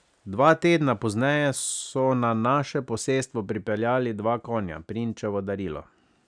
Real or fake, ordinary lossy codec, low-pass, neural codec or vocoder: real; none; 9.9 kHz; none